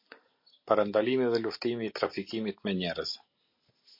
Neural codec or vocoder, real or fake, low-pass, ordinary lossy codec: none; real; 5.4 kHz; MP3, 32 kbps